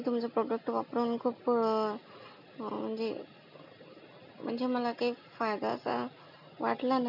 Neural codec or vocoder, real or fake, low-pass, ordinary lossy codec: none; real; 5.4 kHz; none